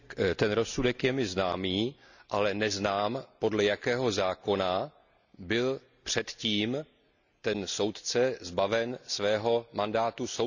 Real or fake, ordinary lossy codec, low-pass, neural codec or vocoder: real; none; 7.2 kHz; none